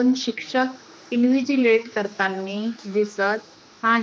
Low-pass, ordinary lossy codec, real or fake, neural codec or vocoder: none; none; fake; codec, 16 kHz, 2 kbps, X-Codec, HuBERT features, trained on general audio